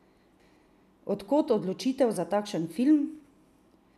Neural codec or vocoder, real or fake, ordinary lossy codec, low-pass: none; real; none; 14.4 kHz